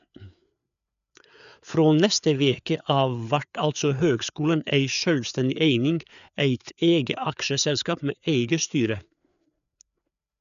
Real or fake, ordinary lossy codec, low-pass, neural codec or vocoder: fake; none; 7.2 kHz; codec, 16 kHz, 4 kbps, FreqCodec, larger model